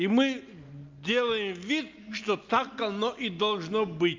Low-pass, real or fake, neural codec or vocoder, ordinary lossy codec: 7.2 kHz; real; none; Opus, 24 kbps